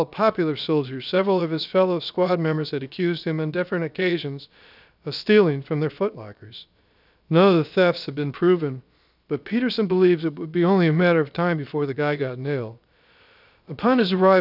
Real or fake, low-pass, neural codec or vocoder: fake; 5.4 kHz; codec, 16 kHz, about 1 kbps, DyCAST, with the encoder's durations